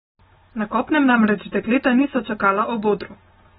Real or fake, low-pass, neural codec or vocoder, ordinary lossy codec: real; 19.8 kHz; none; AAC, 16 kbps